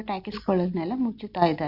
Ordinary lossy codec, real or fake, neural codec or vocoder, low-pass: Opus, 64 kbps; real; none; 5.4 kHz